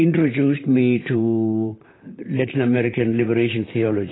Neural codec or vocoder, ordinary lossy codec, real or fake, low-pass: vocoder, 44.1 kHz, 128 mel bands, Pupu-Vocoder; AAC, 16 kbps; fake; 7.2 kHz